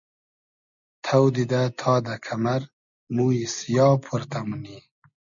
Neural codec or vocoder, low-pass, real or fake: none; 7.2 kHz; real